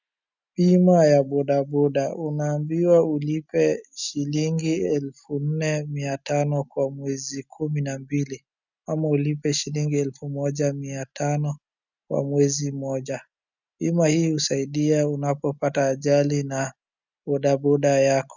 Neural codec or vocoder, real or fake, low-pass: none; real; 7.2 kHz